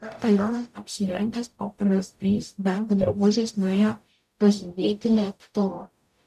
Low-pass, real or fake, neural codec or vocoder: 14.4 kHz; fake; codec, 44.1 kHz, 0.9 kbps, DAC